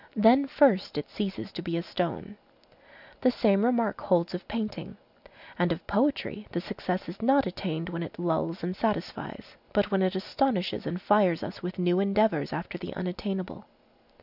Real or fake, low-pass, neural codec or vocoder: real; 5.4 kHz; none